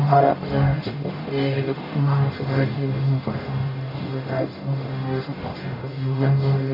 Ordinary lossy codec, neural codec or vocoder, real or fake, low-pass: MP3, 32 kbps; codec, 44.1 kHz, 0.9 kbps, DAC; fake; 5.4 kHz